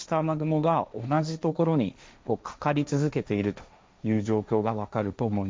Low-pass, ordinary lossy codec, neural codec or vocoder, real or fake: none; none; codec, 16 kHz, 1.1 kbps, Voila-Tokenizer; fake